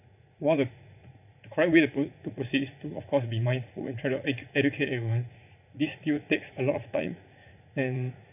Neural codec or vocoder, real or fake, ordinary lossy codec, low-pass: vocoder, 44.1 kHz, 80 mel bands, Vocos; fake; none; 3.6 kHz